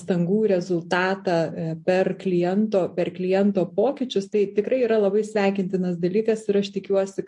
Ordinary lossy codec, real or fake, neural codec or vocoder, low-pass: MP3, 48 kbps; real; none; 10.8 kHz